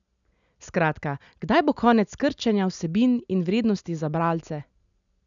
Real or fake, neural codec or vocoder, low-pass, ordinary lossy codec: real; none; 7.2 kHz; none